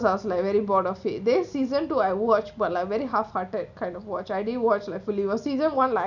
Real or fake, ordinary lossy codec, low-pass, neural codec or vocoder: real; none; 7.2 kHz; none